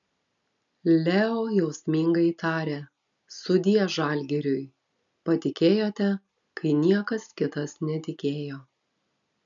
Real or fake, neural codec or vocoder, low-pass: real; none; 7.2 kHz